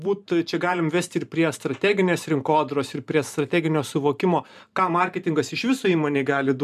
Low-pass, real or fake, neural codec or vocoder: 14.4 kHz; fake; vocoder, 44.1 kHz, 128 mel bands every 512 samples, BigVGAN v2